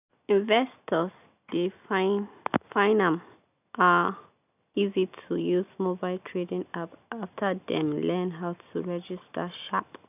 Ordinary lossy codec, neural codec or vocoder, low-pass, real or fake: none; none; 3.6 kHz; real